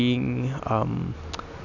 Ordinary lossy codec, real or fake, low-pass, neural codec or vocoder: none; real; 7.2 kHz; none